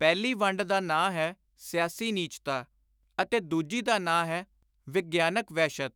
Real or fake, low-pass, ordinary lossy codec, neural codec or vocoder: fake; none; none; autoencoder, 48 kHz, 128 numbers a frame, DAC-VAE, trained on Japanese speech